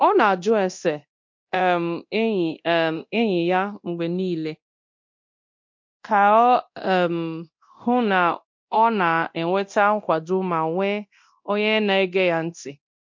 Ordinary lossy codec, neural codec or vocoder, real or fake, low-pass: MP3, 48 kbps; codec, 24 kHz, 0.9 kbps, DualCodec; fake; 7.2 kHz